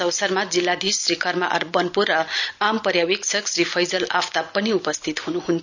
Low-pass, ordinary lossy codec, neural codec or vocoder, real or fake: 7.2 kHz; none; none; real